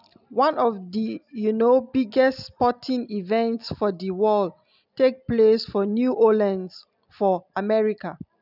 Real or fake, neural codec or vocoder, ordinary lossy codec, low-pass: real; none; none; 5.4 kHz